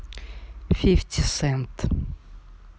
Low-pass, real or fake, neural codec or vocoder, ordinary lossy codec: none; real; none; none